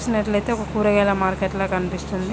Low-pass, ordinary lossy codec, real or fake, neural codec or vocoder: none; none; real; none